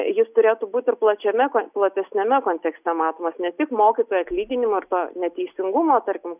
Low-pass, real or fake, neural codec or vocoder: 3.6 kHz; real; none